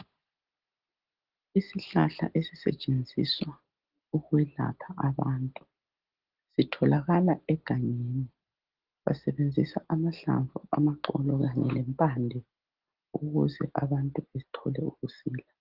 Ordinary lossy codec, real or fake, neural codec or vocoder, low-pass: Opus, 16 kbps; real; none; 5.4 kHz